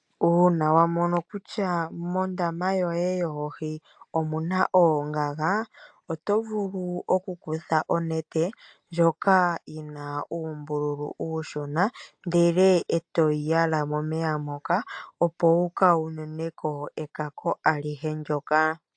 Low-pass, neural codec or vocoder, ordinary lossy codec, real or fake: 9.9 kHz; none; AAC, 64 kbps; real